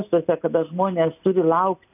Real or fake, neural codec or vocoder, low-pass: real; none; 3.6 kHz